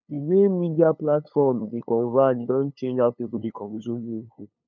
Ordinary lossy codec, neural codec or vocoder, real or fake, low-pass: none; codec, 16 kHz, 2 kbps, FunCodec, trained on LibriTTS, 25 frames a second; fake; 7.2 kHz